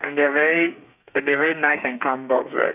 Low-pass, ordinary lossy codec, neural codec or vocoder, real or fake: 3.6 kHz; none; codec, 32 kHz, 1.9 kbps, SNAC; fake